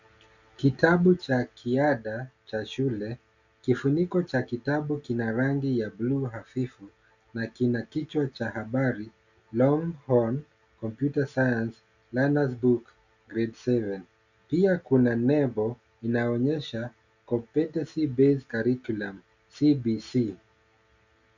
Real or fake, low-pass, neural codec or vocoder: real; 7.2 kHz; none